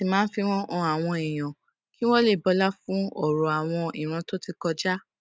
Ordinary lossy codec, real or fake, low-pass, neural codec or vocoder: none; real; none; none